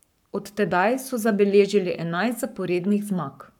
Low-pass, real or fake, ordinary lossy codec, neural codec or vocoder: 19.8 kHz; fake; none; codec, 44.1 kHz, 7.8 kbps, Pupu-Codec